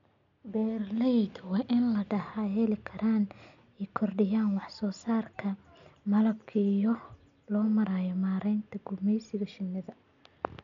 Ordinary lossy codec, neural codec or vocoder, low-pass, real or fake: none; none; 7.2 kHz; real